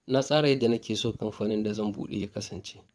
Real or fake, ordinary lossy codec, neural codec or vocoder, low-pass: fake; none; vocoder, 22.05 kHz, 80 mel bands, WaveNeXt; none